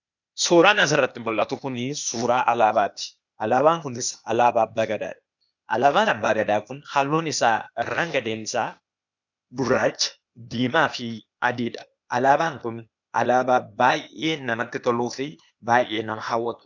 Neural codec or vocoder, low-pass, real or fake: codec, 16 kHz, 0.8 kbps, ZipCodec; 7.2 kHz; fake